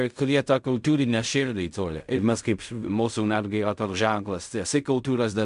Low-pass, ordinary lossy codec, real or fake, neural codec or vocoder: 10.8 kHz; MP3, 96 kbps; fake; codec, 16 kHz in and 24 kHz out, 0.4 kbps, LongCat-Audio-Codec, fine tuned four codebook decoder